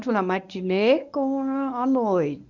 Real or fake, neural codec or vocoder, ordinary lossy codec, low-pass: fake; codec, 24 kHz, 0.9 kbps, WavTokenizer, medium speech release version 1; none; 7.2 kHz